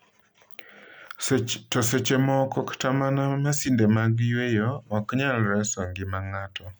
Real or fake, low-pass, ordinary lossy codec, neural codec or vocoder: real; none; none; none